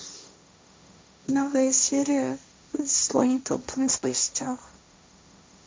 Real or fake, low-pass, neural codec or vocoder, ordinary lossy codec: fake; none; codec, 16 kHz, 1.1 kbps, Voila-Tokenizer; none